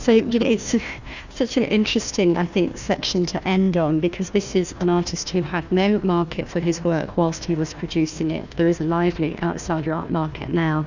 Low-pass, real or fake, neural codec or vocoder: 7.2 kHz; fake; codec, 16 kHz, 1 kbps, FunCodec, trained on Chinese and English, 50 frames a second